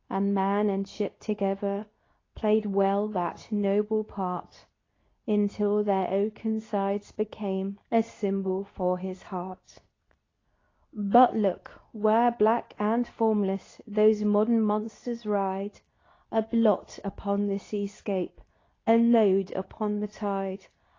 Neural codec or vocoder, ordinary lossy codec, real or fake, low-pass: codec, 24 kHz, 0.9 kbps, WavTokenizer, medium speech release version 2; AAC, 32 kbps; fake; 7.2 kHz